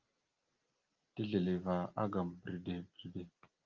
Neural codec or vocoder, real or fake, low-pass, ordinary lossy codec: none; real; 7.2 kHz; Opus, 32 kbps